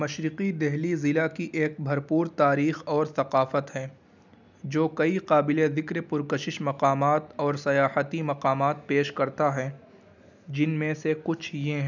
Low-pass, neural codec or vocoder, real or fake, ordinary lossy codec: 7.2 kHz; none; real; none